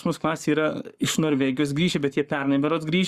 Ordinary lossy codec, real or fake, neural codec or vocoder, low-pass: Opus, 64 kbps; fake; codec, 44.1 kHz, 7.8 kbps, Pupu-Codec; 14.4 kHz